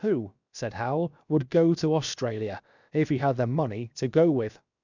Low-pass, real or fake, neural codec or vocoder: 7.2 kHz; fake; codec, 16 kHz, 0.7 kbps, FocalCodec